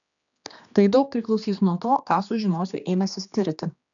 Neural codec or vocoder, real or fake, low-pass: codec, 16 kHz, 2 kbps, X-Codec, HuBERT features, trained on general audio; fake; 7.2 kHz